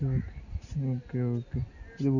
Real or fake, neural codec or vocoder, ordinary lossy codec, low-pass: real; none; none; 7.2 kHz